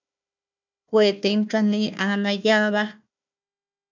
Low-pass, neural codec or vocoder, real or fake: 7.2 kHz; codec, 16 kHz, 1 kbps, FunCodec, trained on Chinese and English, 50 frames a second; fake